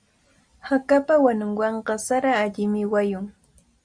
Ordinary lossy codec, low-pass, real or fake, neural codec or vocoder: Opus, 64 kbps; 9.9 kHz; real; none